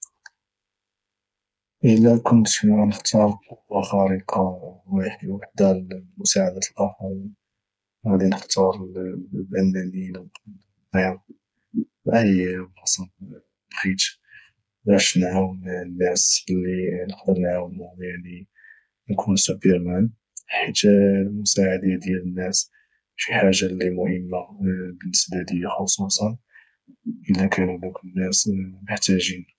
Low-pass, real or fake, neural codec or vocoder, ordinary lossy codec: none; fake; codec, 16 kHz, 8 kbps, FreqCodec, smaller model; none